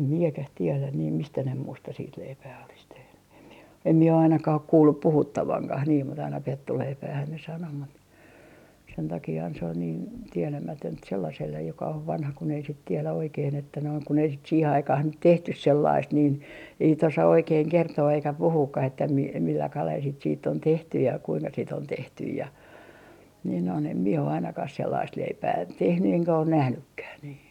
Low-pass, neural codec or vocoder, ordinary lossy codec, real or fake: 19.8 kHz; none; none; real